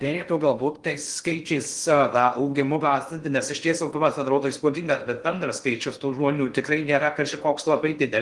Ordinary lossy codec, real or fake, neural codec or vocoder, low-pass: Opus, 24 kbps; fake; codec, 16 kHz in and 24 kHz out, 0.6 kbps, FocalCodec, streaming, 2048 codes; 10.8 kHz